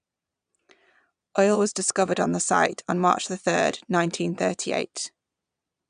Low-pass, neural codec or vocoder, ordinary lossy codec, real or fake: 9.9 kHz; vocoder, 22.05 kHz, 80 mel bands, Vocos; none; fake